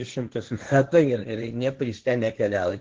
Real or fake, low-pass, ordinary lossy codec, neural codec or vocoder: fake; 7.2 kHz; Opus, 16 kbps; codec, 16 kHz, 1.1 kbps, Voila-Tokenizer